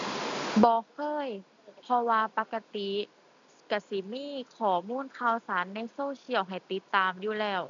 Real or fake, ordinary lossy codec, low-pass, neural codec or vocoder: real; MP3, 64 kbps; 7.2 kHz; none